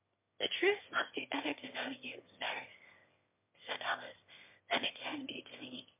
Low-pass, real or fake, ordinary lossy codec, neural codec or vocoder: 3.6 kHz; fake; MP3, 32 kbps; autoencoder, 22.05 kHz, a latent of 192 numbers a frame, VITS, trained on one speaker